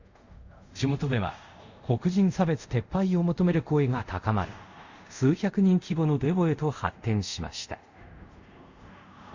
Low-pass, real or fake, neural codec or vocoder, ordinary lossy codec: 7.2 kHz; fake; codec, 24 kHz, 0.5 kbps, DualCodec; Opus, 64 kbps